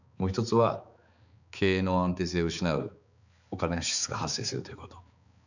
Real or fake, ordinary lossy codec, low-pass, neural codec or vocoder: fake; none; 7.2 kHz; codec, 16 kHz, 4 kbps, X-Codec, HuBERT features, trained on balanced general audio